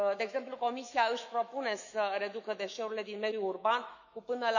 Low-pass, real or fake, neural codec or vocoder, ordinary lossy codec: 7.2 kHz; fake; autoencoder, 48 kHz, 128 numbers a frame, DAC-VAE, trained on Japanese speech; none